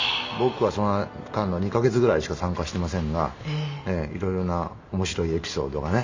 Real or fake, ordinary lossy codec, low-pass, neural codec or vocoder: real; MP3, 48 kbps; 7.2 kHz; none